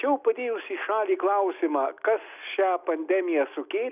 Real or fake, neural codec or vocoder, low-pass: real; none; 3.6 kHz